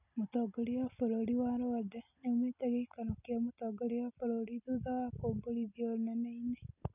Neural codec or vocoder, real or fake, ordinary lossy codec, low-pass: none; real; none; 3.6 kHz